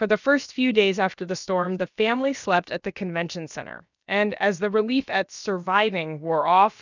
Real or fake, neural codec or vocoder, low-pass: fake; codec, 16 kHz, about 1 kbps, DyCAST, with the encoder's durations; 7.2 kHz